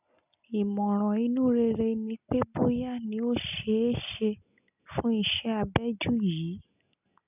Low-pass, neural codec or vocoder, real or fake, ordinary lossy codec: 3.6 kHz; none; real; none